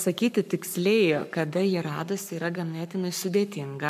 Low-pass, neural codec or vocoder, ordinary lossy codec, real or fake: 14.4 kHz; codec, 44.1 kHz, 7.8 kbps, Pupu-Codec; MP3, 96 kbps; fake